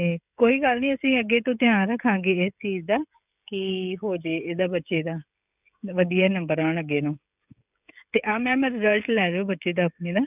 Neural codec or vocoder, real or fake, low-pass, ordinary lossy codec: codec, 16 kHz, 16 kbps, FreqCodec, smaller model; fake; 3.6 kHz; none